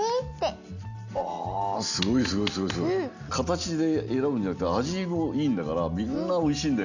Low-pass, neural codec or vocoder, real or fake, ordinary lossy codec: 7.2 kHz; none; real; none